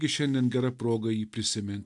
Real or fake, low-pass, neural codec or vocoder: real; 10.8 kHz; none